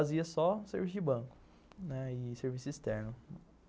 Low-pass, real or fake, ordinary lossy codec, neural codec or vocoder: none; real; none; none